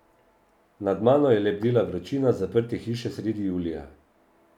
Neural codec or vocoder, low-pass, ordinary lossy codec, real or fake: none; 19.8 kHz; none; real